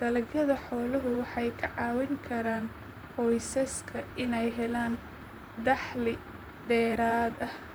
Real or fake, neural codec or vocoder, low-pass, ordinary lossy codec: fake; vocoder, 44.1 kHz, 128 mel bands, Pupu-Vocoder; none; none